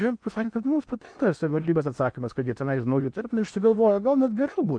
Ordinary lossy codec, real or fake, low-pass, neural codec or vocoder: MP3, 64 kbps; fake; 9.9 kHz; codec, 16 kHz in and 24 kHz out, 0.8 kbps, FocalCodec, streaming, 65536 codes